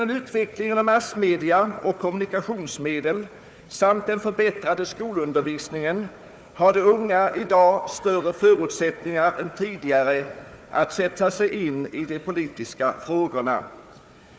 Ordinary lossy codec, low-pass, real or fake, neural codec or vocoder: none; none; fake; codec, 16 kHz, 4 kbps, FunCodec, trained on Chinese and English, 50 frames a second